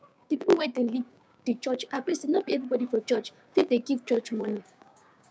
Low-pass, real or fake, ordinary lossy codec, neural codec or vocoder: none; fake; none; codec, 16 kHz, 6 kbps, DAC